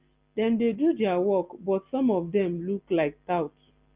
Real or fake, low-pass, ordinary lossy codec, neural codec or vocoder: real; 3.6 kHz; Opus, 24 kbps; none